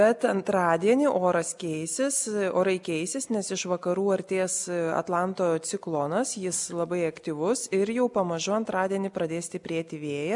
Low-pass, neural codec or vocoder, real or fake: 10.8 kHz; none; real